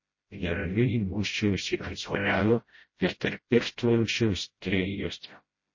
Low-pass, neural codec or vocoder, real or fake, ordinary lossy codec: 7.2 kHz; codec, 16 kHz, 0.5 kbps, FreqCodec, smaller model; fake; MP3, 32 kbps